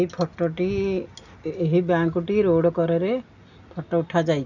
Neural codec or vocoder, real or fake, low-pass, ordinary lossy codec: none; real; 7.2 kHz; none